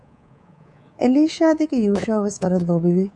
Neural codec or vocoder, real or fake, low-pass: codec, 24 kHz, 3.1 kbps, DualCodec; fake; 10.8 kHz